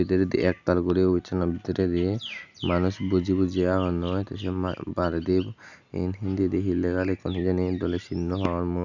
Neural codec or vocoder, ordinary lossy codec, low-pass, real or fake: none; none; 7.2 kHz; real